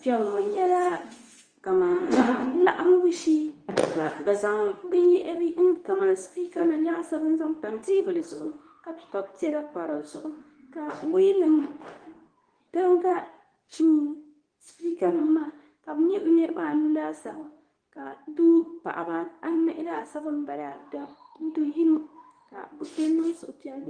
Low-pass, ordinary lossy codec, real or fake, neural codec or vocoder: 9.9 kHz; Opus, 24 kbps; fake; codec, 24 kHz, 0.9 kbps, WavTokenizer, medium speech release version 2